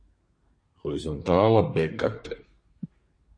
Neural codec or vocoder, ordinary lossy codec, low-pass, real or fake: codec, 24 kHz, 1 kbps, SNAC; MP3, 48 kbps; 9.9 kHz; fake